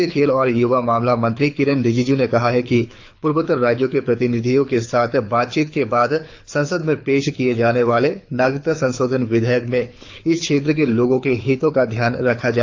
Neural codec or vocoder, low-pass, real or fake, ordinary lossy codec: codec, 24 kHz, 6 kbps, HILCodec; 7.2 kHz; fake; AAC, 48 kbps